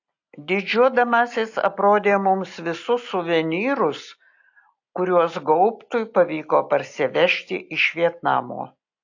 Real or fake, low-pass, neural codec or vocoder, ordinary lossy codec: real; 7.2 kHz; none; AAC, 48 kbps